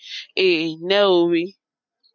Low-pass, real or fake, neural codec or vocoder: 7.2 kHz; real; none